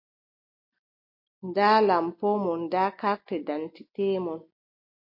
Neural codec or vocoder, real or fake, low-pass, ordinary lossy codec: none; real; 5.4 kHz; MP3, 32 kbps